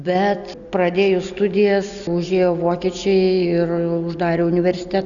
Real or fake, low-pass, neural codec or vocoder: real; 7.2 kHz; none